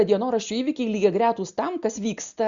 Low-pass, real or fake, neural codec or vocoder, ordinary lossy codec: 7.2 kHz; real; none; Opus, 64 kbps